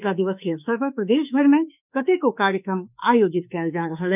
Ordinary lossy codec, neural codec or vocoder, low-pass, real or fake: none; autoencoder, 48 kHz, 32 numbers a frame, DAC-VAE, trained on Japanese speech; 3.6 kHz; fake